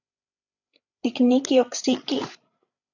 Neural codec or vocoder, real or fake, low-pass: codec, 16 kHz, 16 kbps, FreqCodec, larger model; fake; 7.2 kHz